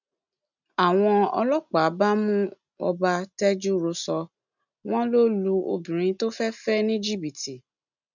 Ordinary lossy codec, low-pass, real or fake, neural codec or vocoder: none; 7.2 kHz; real; none